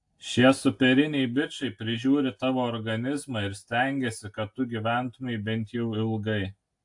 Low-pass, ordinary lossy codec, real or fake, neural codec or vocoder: 10.8 kHz; AAC, 64 kbps; real; none